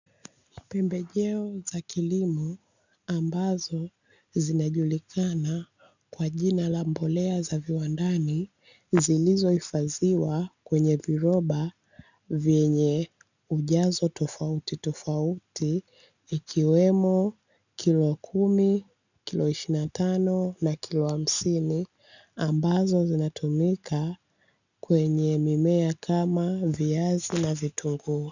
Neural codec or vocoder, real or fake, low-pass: none; real; 7.2 kHz